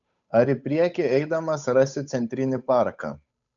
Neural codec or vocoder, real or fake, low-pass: codec, 16 kHz, 8 kbps, FunCodec, trained on Chinese and English, 25 frames a second; fake; 7.2 kHz